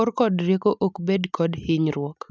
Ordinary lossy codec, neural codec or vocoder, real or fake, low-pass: none; none; real; none